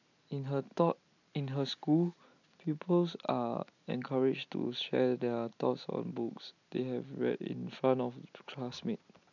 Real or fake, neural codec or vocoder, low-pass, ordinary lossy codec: real; none; 7.2 kHz; none